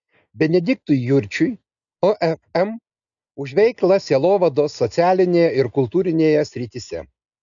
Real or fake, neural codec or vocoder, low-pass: real; none; 7.2 kHz